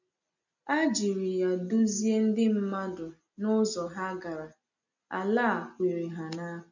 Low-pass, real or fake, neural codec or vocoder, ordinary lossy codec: 7.2 kHz; real; none; none